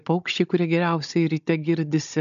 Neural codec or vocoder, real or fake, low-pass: codec, 16 kHz, 16 kbps, FunCodec, trained on Chinese and English, 50 frames a second; fake; 7.2 kHz